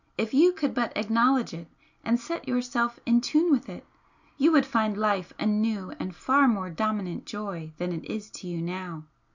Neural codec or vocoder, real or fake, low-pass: none; real; 7.2 kHz